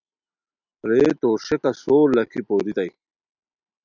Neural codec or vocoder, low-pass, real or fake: none; 7.2 kHz; real